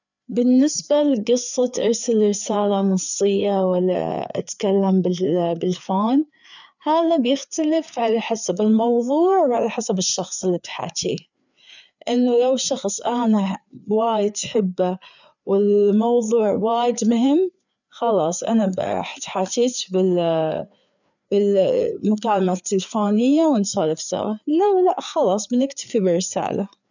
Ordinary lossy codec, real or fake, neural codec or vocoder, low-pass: none; fake; codec, 16 kHz, 4 kbps, FreqCodec, larger model; 7.2 kHz